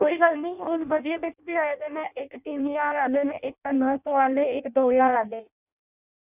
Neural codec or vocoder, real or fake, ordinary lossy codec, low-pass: codec, 16 kHz in and 24 kHz out, 0.6 kbps, FireRedTTS-2 codec; fake; none; 3.6 kHz